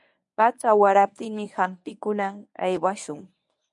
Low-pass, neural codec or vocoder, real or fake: 10.8 kHz; codec, 24 kHz, 0.9 kbps, WavTokenizer, medium speech release version 1; fake